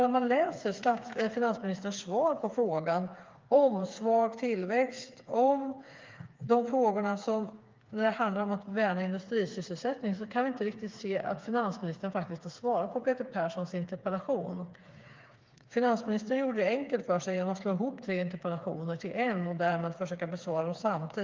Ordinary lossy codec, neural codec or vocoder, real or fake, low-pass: Opus, 32 kbps; codec, 16 kHz, 4 kbps, FreqCodec, smaller model; fake; 7.2 kHz